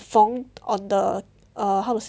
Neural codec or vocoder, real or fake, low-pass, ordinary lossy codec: none; real; none; none